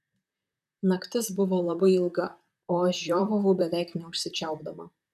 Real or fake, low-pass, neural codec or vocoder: fake; 14.4 kHz; vocoder, 44.1 kHz, 128 mel bands, Pupu-Vocoder